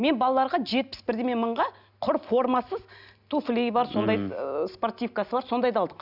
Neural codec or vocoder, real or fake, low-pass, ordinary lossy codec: none; real; 5.4 kHz; none